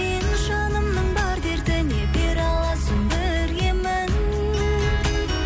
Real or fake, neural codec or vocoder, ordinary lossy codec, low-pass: real; none; none; none